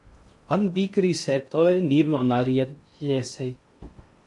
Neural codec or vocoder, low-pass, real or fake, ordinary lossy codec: codec, 16 kHz in and 24 kHz out, 0.6 kbps, FocalCodec, streaming, 2048 codes; 10.8 kHz; fake; MP3, 64 kbps